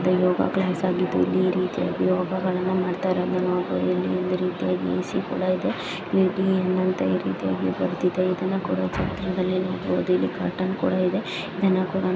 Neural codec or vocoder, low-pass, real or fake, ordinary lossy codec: none; none; real; none